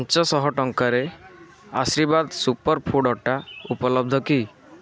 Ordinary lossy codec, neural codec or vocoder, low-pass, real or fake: none; none; none; real